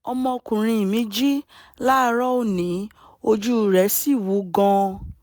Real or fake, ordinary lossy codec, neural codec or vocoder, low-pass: real; none; none; none